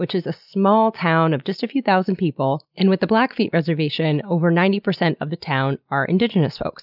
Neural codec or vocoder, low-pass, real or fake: none; 5.4 kHz; real